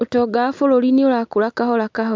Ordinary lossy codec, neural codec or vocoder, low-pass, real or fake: MP3, 64 kbps; none; 7.2 kHz; real